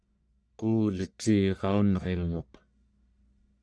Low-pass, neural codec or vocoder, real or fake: 9.9 kHz; codec, 44.1 kHz, 1.7 kbps, Pupu-Codec; fake